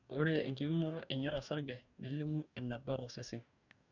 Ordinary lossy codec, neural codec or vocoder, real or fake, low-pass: none; codec, 44.1 kHz, 2.6 kbps, DAC; fake; 7.2 kHz